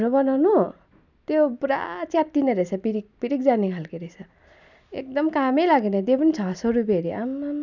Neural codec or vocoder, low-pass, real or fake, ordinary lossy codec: none; 7.2 kHz; real; none